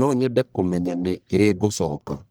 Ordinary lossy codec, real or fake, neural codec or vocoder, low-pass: none; fake; codec, 44.1 kHz, 1.7 kbps, Pupu-Codec; none